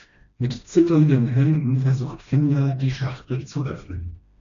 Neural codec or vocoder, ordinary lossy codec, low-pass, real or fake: codec, 16 kHz, 1 kbps, FreqCodec, smaller model; MP3, 64 kbps; 7.2 kHz; fake